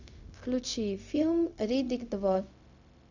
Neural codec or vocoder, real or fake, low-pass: codec, 16 kHz, 0.4 kbps, LongCat-Audio-Codec; fake; 7.2 kHz